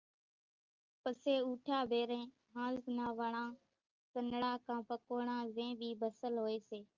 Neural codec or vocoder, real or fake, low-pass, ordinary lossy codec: none; real; 7.2 kHz; Opus, 24 kbps